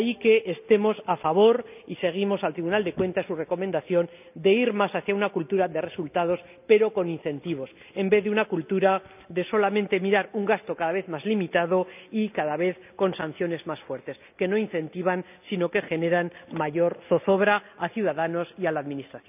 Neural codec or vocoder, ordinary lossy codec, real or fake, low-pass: none; none; real; 3.6 kHz